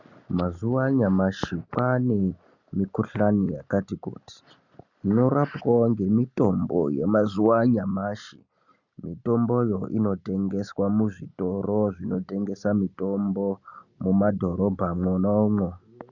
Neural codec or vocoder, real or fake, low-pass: none; real; 7.2 kHz